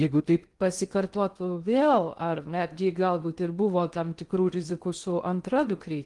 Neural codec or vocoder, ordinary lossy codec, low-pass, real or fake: codec, 16 kHz in and 24 kHz out, 0.6 kbps, FocalCodec, streaming, 2048 codes; Opus, 24 kbps; 10.8 kHz; fake